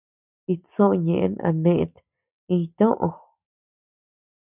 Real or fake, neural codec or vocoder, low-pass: real; none; 3.6 kHz